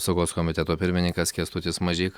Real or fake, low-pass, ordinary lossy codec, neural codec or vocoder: real; 19.8 kHz; Opus, 64 kbps; none